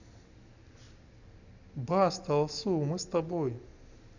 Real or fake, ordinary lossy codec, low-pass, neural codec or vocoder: real; none; 7.2 kHz; none